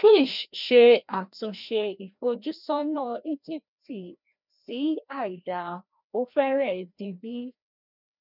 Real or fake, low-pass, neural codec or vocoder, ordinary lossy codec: fake; 5.4 kHz; codec, 16 kHz, 1 kbps, FreqCodec, larger model; none